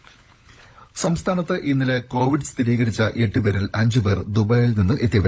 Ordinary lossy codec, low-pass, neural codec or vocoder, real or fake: none; none; codec, 16 kHz, 16 kbps, FunCodec, trained on LibriTTS, 50 frames a second; fake